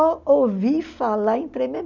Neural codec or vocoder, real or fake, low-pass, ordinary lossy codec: none; real; 7.2 kHz; none